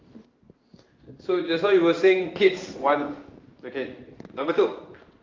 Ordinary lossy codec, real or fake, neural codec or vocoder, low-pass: Opus, 32 kbps; fake; codec, 16 kHz in and 24 kHz out, 1 kbps, XY-Tokenizer; 7.2 kHz